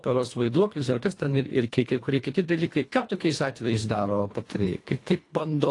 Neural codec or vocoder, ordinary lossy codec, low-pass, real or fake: codec, 24 kHz, 1.5 kbps, HILCodec; AAC, 48 kbps; 10.8 kHz; fake